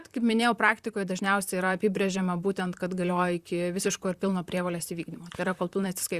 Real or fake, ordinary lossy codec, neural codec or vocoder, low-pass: fake; Opus, 64 kbps; vocoder, 44.1 kHz, 128 mel bands, Pupu-Vocoder; 14.4 kHz